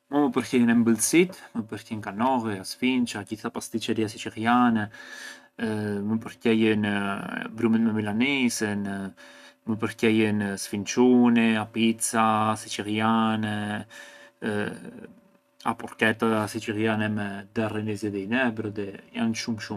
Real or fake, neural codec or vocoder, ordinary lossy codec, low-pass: real; none; none; 14.4 kHz